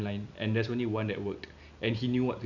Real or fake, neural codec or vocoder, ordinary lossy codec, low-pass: real; none; none; 7.2 kHz